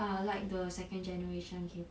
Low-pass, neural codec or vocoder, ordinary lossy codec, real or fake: none; none; none; real